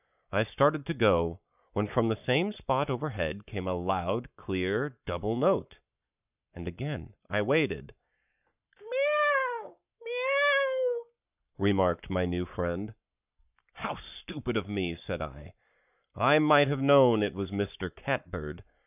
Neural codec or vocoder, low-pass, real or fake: codec, 44.1 kHz, 7.8 kbps, Pupu-Codec; 3.6 kHz; fake